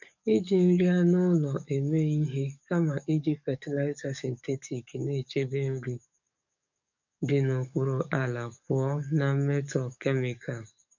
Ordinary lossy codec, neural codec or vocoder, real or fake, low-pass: none; codec, 44.1 kHz, 7.8 kbps, DAC; fake; 7.2 kHz